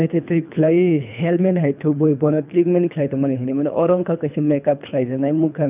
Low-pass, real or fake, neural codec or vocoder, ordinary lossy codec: 3.6 kHz; fake; codec, 24 kHz, 3 kbps, HILCodec; none